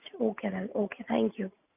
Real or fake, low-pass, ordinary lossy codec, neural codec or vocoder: real; 3.6 kHz; none; none